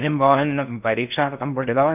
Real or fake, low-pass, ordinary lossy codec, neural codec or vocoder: fake; 3.6 kHz; none; codec, 16 kHz in and 24 kHz out, 0.6 kbps, FocalCodec, streaming, 4096 codes